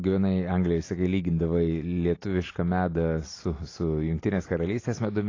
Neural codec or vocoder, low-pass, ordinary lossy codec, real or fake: none; 7.2 kHz; AAC, 32 kbps; real